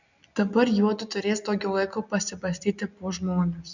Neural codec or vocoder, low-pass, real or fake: none; 7.2 kHz; real